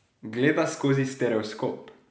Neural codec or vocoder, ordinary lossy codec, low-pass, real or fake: none; none; none; real